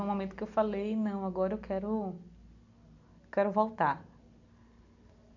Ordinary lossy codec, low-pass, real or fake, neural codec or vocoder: none; 7.2 kHz; real; none